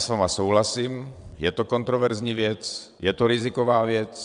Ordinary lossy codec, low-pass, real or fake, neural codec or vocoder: MP3, 96 kbps; 9.9 kHz; fake; vocoder, 22.05 kHz, 80 mel bands, WaveNeXt